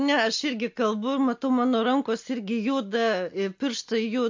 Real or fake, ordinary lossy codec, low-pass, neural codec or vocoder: real; MP3, 48 kbps; 7.2 kHz; none